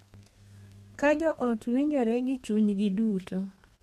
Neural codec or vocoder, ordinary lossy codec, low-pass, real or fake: codec, 32 kHz, 1.9 kbps, SNAC; MP3, 64 kbps; 14.4 kHz; fake